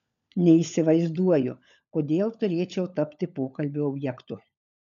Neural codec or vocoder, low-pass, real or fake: codec, 16 kHz, 16 kbps, FunCodec, trained on LibriTTS, 50 frames a second; 7.2 kHz; fake